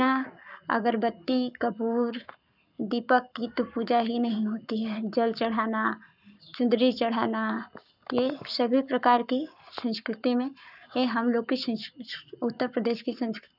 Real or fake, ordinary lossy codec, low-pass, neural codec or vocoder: fake; none; 5.4 kHz; codec, 44.1 kHz, 7.8 kbps, Pupu-Codec